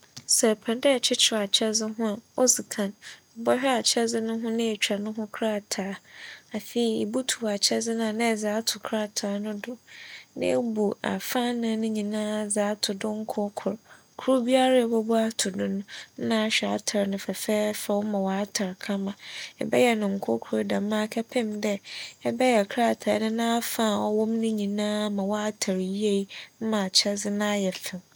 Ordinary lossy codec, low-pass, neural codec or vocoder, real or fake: none; none; none; real